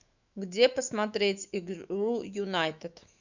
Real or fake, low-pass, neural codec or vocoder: fake; 7.2 kHz; autoencoder, 48 kHz, 128 numbers a frame, DAC-VAE, trained on Japanese speech